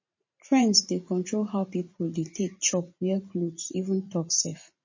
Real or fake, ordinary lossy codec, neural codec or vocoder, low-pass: fake; MP3, 32 kbps; vocoder, 44.1 kHz, 128 mel bands every 512 samples, BigVGAN v2; 7.2 kHz